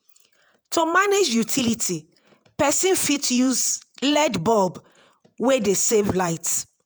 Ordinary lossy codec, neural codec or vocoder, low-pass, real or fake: none; vocoder, 48 kHz, 128 mel bands, Vocos; none; fake